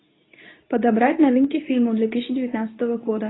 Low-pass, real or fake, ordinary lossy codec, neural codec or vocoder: 7.2 kHz; fake; AAC, 16 kbps; codec, 24 kHz, 0.9 kbps, WavTokenizer, medium speech release version 2